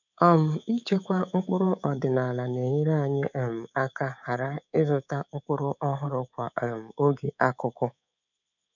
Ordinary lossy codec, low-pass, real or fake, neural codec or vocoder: none; 7.2 kHz; fake; codec, 24 kHz, 3.1 kbps, DualCodec